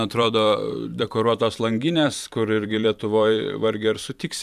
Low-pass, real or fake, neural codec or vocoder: 14.4 kHz; fake; vocoder, 44.1 kHz, 128 mel bands every 512 samples, BigVGAN v2